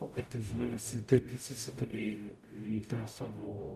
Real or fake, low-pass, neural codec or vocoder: fake; 14.4 kHz; codec, 44.1 kHz, 0.9 kbps, DAC